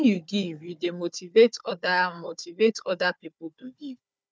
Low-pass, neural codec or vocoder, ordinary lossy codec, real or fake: none; codec, 16 kHz, 4 kbps, FunCodec, trained on Chinese and English, 50 frames a second; none; fake